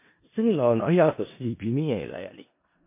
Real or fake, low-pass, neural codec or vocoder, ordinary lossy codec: fake; 3.6 kHz; codec, 16 kHz in and 24 kHz out, 0.4 kbps, LongCat-Audio-Codec, four codebook decoder; MP3, 24 kbps